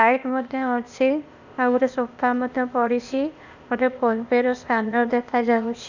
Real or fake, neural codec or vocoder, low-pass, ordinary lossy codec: fake; codec, 16 kHz, 0.8 kbps, ZipCodec; 7.2 kHz; none